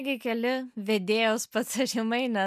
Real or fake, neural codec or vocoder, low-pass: real; none; 14.4 kHz